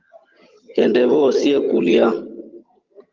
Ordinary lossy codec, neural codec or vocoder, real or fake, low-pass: Opus, 24 kbps; vocoder, 22.05 kHz, 80 mel bands, HiFi-GAN; fake; 7.2 kHz